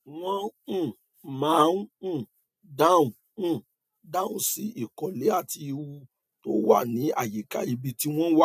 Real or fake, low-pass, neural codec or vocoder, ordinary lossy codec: real; 14.4 kHz; none; none